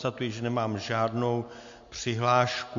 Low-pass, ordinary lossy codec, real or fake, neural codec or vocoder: 7.2 kHz; MP3, 48 kbps; real; none